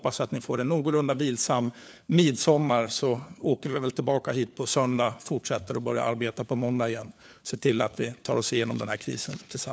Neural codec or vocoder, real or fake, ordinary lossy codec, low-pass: codec, 16 kHz, 4 kbps, FunCodec, trained on LibriTTS, 50 frames a second; fake; none; none